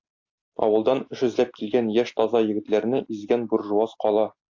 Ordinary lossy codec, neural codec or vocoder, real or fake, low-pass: AAC, 48 kbps; none; real; 7.2 kHz